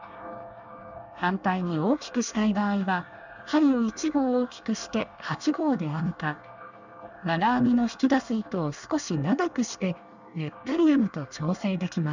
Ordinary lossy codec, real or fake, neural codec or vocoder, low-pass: none; fake; codec, 24 kHz, 1 kbps, SNAC; 7.2 kHz